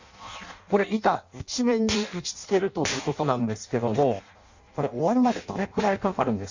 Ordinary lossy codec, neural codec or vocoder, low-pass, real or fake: Opus, 64 kbps; codec, 16 kHz in and 24 kHz out, 0.6 kbps, FireRedTTS-2 codec; 7.2 kHz; fake